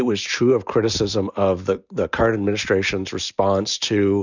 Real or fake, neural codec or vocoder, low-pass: real; none; 7.2 kHz